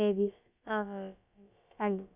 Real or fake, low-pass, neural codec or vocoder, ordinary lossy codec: fake; 3.6 kHz; codec, 16 kHz, about 1 kbps, DyCAST, with the encoder's durations; none